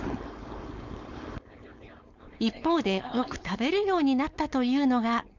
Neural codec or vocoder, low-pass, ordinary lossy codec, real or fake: codec, 16 kHz, 4.8 kbps, FACodec; 7.2 kHz; Opus, 64 kbps; fake